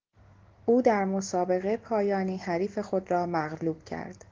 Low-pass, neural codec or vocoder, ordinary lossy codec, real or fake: 7.2 kHz; none; Opus, 16 kbps; real